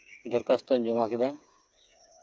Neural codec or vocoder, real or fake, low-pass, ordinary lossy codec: codec, 16 kHz, 4 kbps, FreqCodec, smaller model; fake; none; none